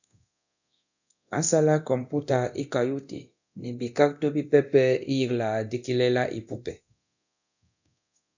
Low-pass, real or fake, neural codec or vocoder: 7.2 kHz; fake; codec, 24 kHz, 0.9 kbps, DualCodec